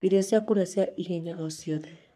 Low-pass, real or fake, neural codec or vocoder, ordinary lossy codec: 14.4 kHz; fake; codec, 44.1 kHz, 3.4 kbps, Pupu-Codec; none